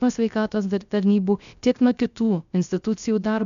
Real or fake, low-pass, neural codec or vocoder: fake; 7.2 kHz; codec, 16 kHz, 0.3 kbps, FocalCodec